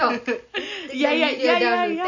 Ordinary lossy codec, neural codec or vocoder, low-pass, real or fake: none; none; 7.2 kHz; real